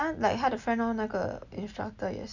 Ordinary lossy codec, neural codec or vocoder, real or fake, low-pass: none; none; real; 7.2 kHz